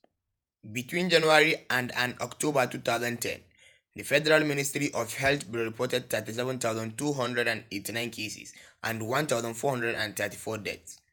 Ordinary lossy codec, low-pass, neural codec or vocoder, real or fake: none; none; vocoder, 48 kHz, 128 mel bands, Vocos; fake